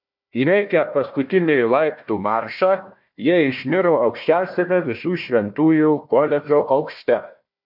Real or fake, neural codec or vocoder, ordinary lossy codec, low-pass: fake; codec, 16 kHz, 1 kbps, FunCodec, trained on Chinese and English, 50 frames a second; MP3, 48 kbps; 5.4 kHz